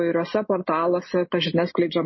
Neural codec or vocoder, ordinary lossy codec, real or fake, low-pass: none; MP3, 24 kbps; real; 7.2 kHz